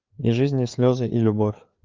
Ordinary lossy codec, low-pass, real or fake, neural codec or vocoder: Opus, 24 kbps; 7.2 kHz; fake; codec, 16 kHz, 4 kbps, FreqCodec, larger model